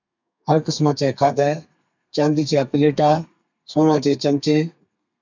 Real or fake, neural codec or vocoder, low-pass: fake; codec, 32 kHz, 1.9 kbps, SNAC; 7.2 kHz